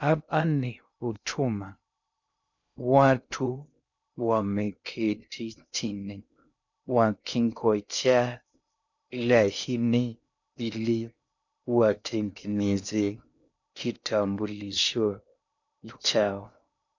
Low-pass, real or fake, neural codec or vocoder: 7.2 kHz; fake; codec, 16 kHz in and 24 kHz out, 0.6 kbps, FocalCodec, streaming, 2048 codes